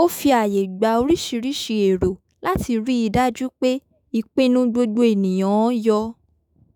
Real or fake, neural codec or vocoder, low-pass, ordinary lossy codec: fake; autoencoder, 48 kHz, 128 numbers a frame, DAC-VAE, trained on Japanese speech; none; none